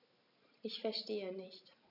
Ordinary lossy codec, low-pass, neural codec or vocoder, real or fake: none; 5.4 kHz; none; real